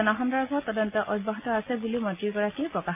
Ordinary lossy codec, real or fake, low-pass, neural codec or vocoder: MP3, 24 kbps; real; 3.6 kHz; none